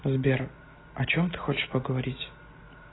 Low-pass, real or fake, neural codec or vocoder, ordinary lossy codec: 7.2 kHz; real; none; AAC, 16 kbps